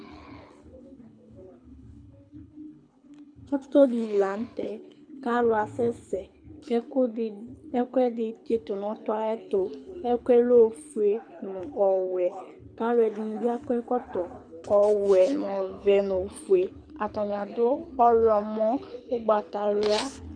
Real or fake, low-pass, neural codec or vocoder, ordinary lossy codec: fake; 9.9 kHz; codec, 24 kHz, 6 kbps, HILCodec; MP3, 96 kbps